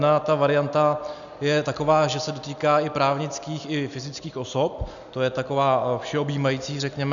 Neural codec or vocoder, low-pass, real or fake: none; 7.2 kHz; real